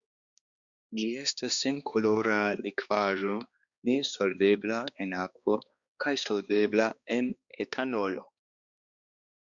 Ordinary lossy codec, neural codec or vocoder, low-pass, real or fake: Opus, 64 kbps; codec, 16 kHz, 2 kbps, X-Codec, HuBERT features, trained on balanced general audio; 7.2 kHz; fake